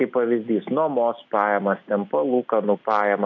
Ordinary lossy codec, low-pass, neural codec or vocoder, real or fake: AAC, 48 kbps; 7.2 kHz; none; real